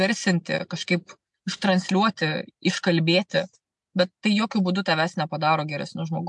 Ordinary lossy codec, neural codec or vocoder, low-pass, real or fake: MP3, 64 kbps; none; 10.8 kHz; real